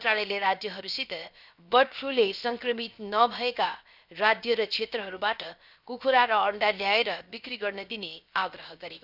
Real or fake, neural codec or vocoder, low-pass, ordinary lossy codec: fake; codec, 16 kHz, 0.7 kbps, FocalCodec; 5.4 kHz; none